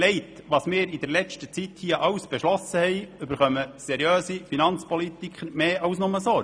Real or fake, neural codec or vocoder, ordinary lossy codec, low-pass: real; none; none; 9.9 kHz